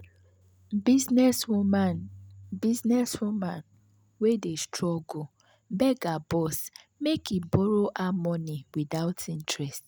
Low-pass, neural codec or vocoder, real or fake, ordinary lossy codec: none; none; real; none